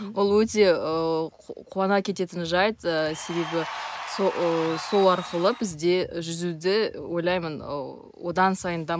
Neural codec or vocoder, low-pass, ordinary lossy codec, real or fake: none; none; none; real